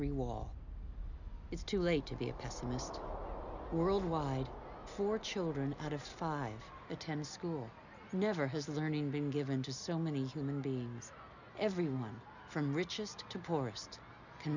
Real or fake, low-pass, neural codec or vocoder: real; 7.2 kHz; none